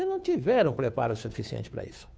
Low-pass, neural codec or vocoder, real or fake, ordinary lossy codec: none; codec, 16 kHz, 2 kbps, FunCodec, trained on Chinese and English, 25 frames a second; fake; none